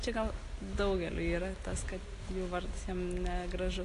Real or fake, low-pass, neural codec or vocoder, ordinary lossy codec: real; 10.8 kHz; none; AAC, 48 kbps